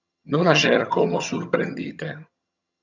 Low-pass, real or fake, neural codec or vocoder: 7.2 kHz; fake; vocoder, 22.05 kHz, 80 mel bands, HiFi-GAN